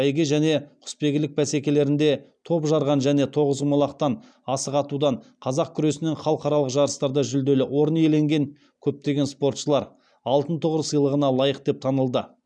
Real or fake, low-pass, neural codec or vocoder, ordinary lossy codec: real; 9.9 kHz; none; none